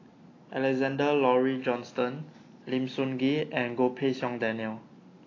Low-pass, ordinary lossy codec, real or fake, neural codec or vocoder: 7.2 kHz; AAC, 32 kbps; real; none